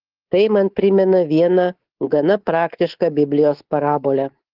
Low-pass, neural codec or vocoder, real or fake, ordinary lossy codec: 5.4 kHz; codec, 24 kHz, 3.1 kbps, DualCodec; fake; Opus, 16 kbps